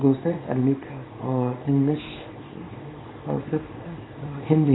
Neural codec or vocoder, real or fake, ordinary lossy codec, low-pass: codec, 24 kHz, 0.9 kbps, WavTokenizer, small release; fake; AAC, 16 kbps; 7.2 kHz